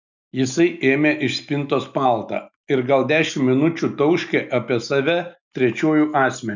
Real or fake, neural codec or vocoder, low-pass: real; none; 7.2 kHz